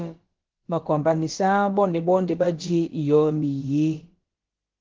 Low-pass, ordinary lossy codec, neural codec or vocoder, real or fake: 7.2 kHz; Opus, 16 kbps; codec, 16 kHz, about 1 kbps, DyCAST, with the encoder's durations; fake